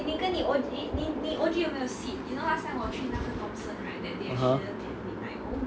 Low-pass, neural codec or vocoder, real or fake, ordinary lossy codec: none; none; real; none